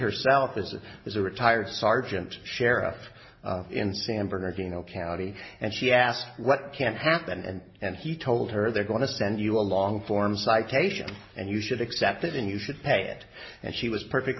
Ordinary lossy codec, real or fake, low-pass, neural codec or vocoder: MP3, 24 kbps; real; 7.2 kHz; none